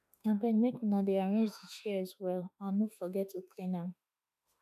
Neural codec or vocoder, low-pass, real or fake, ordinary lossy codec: autoencoder, 48 kHz, 32 numbers a frame, DAC-VAE, trained on Japanese speech; 14.4 kHz; fake; none